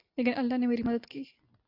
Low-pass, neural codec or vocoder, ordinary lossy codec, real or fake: 5.4 kHz; none; AAC, 32 kbps; real